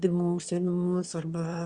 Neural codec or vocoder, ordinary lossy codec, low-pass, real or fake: autoencoder, 22.05 kHz, a latent of 192 numbers a frame, VITS, trained on one speaker; Opus, 64 kbps; 9.9 kHz; fake